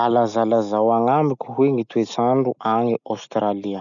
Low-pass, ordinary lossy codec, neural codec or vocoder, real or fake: 7.2 kHz; none; none; real